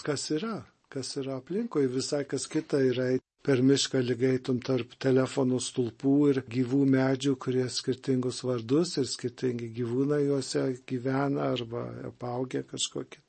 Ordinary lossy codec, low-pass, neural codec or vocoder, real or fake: MP3, 32 kbps; 10.8 kHz; none; real